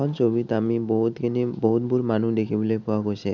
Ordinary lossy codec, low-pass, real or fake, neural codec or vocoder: none; 7.2 kHz; real; none